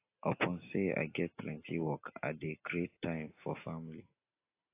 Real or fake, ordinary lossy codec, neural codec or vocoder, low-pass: real; AAC, 24 kbps; none; 3.6 kHz